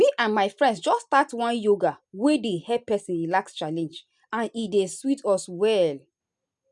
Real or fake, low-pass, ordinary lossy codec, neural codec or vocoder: real; 10.8 kHz; none; none